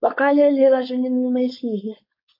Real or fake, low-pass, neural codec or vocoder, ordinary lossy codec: fake; 5.4 kHz; codec, 16 kHz, 4.8 kbps, FACodec; MP3, 32 kbps